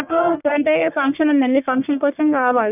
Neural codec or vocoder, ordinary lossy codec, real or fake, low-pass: codec, 44.1 kHz, 1.7 kbps, Pupu-Codec; none; fake; 3.6 kHz